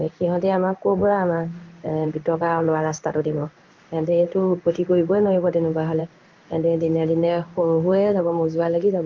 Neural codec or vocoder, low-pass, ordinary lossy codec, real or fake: codec, 16 kHz in and 24 kHz out, 1 kbps, XY-Tokenizer; 7.2 kHz; Opus, 32 kbps; fake